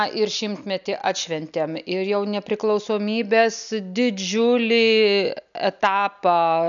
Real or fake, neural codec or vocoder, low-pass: real; none; 7.2 kHz